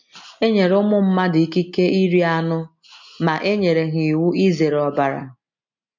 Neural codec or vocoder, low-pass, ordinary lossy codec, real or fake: none; 7.2 kHz; MP3, 48 kbps; real